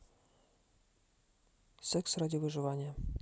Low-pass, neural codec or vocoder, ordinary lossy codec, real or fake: none; none; none; real